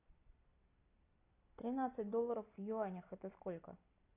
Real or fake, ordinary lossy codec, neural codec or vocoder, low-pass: fake; AAC, 32 kbps; vocoder, 44.1 kHz, 128 mel bands every 256 samples, BigVGAN v2; 3.6 kHz